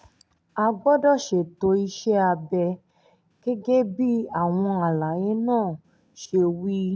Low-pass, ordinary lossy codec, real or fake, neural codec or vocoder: none; none; real; none